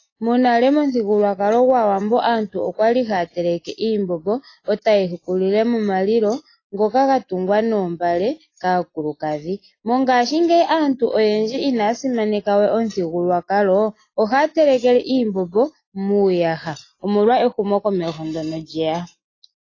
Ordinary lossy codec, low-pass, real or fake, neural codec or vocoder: AAC, 32 kbps; 7.2 kHz; real; none